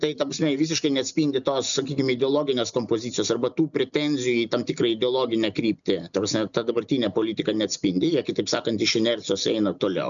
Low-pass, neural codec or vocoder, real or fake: 7.2 kHz; none; real